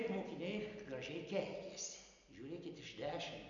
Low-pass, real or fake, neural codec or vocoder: 7.2 kHz; real; none